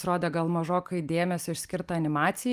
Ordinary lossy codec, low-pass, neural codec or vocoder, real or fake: Opus, 32 kbps; 14.4 kHz; none; real